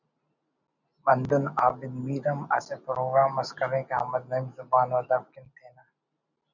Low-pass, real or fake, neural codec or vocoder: 7.2 kHz; real; none